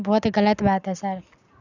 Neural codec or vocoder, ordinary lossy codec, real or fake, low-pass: none; none; real; 7.2 kHz